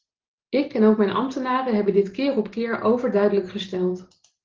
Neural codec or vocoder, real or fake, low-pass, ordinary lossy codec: none; real; 7.2 kHz; Opus, 16 kbps